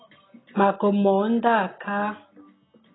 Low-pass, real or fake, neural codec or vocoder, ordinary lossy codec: 7.2 kHz; real; none; AAC, 16 kbps